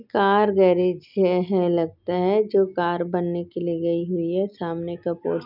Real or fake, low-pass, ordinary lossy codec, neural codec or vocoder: real; 5.4 kHz; none; none